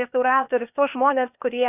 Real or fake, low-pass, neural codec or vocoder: fake; 3.6 kHz; codec, 16 kHz, 0.8 kbps, ZipCodec